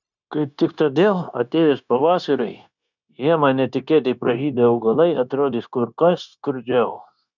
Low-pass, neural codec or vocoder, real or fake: 7.2 kHz; codec, 16 kHz, 0.9 kbps, LongCat-Audio-Codec; fake